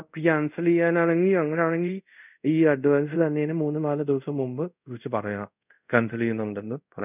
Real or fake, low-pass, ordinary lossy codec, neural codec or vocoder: fake; 3.6 kHz; none; codec, 24 kHz, 0.5 kbps, DualCodec